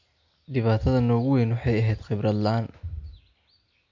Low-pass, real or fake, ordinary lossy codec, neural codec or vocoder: 7.2 kHz; real; MP3, 48 kbps; none